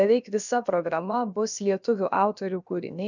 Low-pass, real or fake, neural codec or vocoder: 7.2 kHz; fake; codec, 16 kHz, about 1 kbps, DyCAST, with the encoder's durations